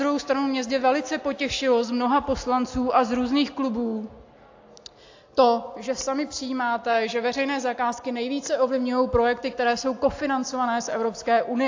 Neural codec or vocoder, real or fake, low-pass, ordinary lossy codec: none; real; 7.2 kHz; AAC, 48 kbps